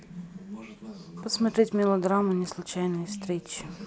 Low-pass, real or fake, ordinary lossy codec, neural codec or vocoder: none; real; none; none